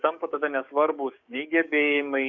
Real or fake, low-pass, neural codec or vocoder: fake; 7.2 kHz; codec, 44.1 kHz, 7.8 kbps, DAC